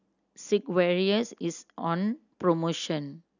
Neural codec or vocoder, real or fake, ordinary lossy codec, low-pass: none; real; none; 7.2 kHz